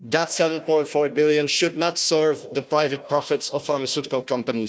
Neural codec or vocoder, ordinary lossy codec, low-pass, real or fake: codec, 16 kHz, 1 kbps, FunCodec, trained on Chinese and English, 50 frames a second; none; none; fake